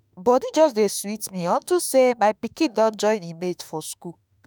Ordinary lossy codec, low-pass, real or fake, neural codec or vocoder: none; none; fake; autoencoder, 48 kHz, 32 numbers a frame, DAC-VAE, trained on Japanese speech